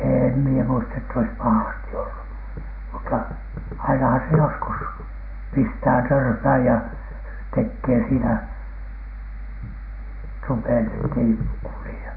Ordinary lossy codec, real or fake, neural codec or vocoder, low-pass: none; real; none; 5.4 kHz